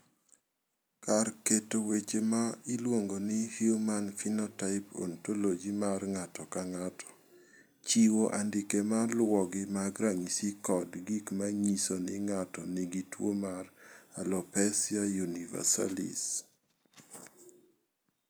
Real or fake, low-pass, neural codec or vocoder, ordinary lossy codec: real; none; none; none